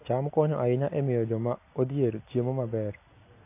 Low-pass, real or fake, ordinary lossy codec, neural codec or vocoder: 3.6 kHz; real; AAC, 24 kbps; none